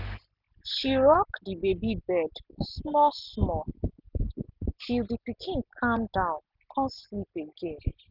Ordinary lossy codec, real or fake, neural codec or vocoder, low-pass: none; real; none; 5.4 kHz